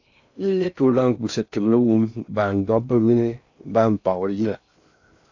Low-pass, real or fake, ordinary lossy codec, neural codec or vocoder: 7.2 kHz; fake; AAC, 48 kbps; codec, 16 kHz in and 24 kHz out, 0.6 kbps, FocalCodec, streaming, 4096 codes